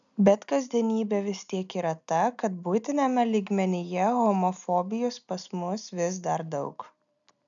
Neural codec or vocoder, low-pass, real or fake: none; 7.2 kHz; real